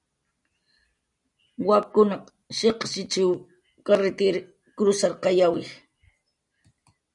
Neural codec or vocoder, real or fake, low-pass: none; real; 10.8 kHz